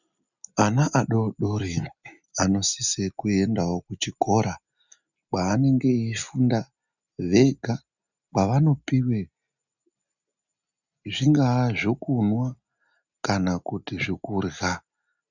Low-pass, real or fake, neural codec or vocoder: 7.2 kHz; real; none